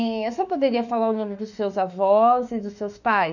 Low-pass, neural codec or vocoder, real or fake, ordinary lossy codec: 7.2 kHz; autoencoder, 48 kHz, 32 numbers a frame, DAC-VAE, trained on Japanese speech; fake; none